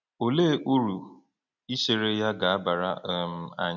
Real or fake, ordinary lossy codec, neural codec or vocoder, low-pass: real; none; none; 7.2 kHz